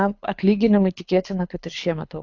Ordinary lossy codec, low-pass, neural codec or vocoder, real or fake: AAC, 48 kbps; 7.2 kHz; codec, 24 kHz, 6 kbps, HILCodec; fake